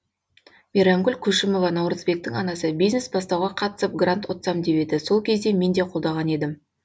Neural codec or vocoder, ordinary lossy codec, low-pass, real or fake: none; none; none; real